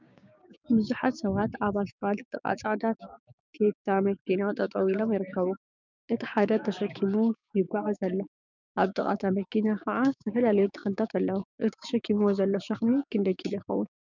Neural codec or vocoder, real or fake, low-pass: codec, 16 kHz, 6 kbps, DAC; fake; 7.2 kHz